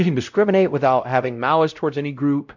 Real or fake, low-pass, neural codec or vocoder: fake; 7.2 kHz; codec, 16 kHz, 0.5 kbps, X-Codec, WavLM features, trained on Multilingual LibriSpeech